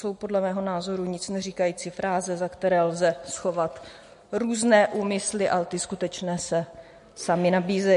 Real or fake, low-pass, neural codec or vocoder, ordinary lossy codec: real; 14.4 kHz; none; MP3, 48 kbps